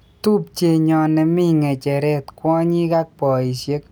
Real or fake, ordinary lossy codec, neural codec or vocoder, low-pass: real; none; none; none